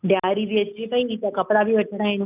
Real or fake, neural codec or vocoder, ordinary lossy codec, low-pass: real; none; none; 3.6 kHz